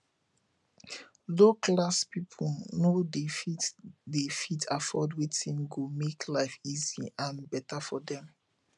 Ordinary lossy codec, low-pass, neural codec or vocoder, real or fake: none; 10.8 kHz; none; real